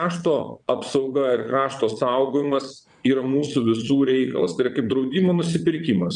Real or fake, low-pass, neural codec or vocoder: fake; 9.9 kHz; vocoder, 22.05 kHz, 80 mel bands, Vocos